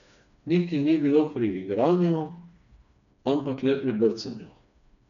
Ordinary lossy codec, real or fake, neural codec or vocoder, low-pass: none; fake; codec, 16 kHz, 2 kbps, FreqCodec, smaller model; 7.2 kHz